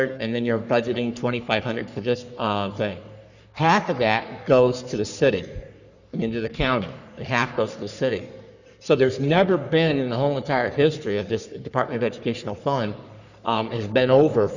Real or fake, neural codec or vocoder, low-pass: fake; codec, 44.1 kHz, 3.4 kbps, Pupu-Codec; 7.2 kHz